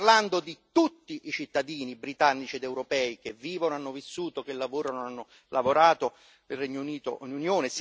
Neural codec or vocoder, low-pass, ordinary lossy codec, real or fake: none; none; none; real